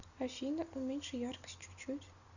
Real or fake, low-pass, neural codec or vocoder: real; 7.2 kHz; none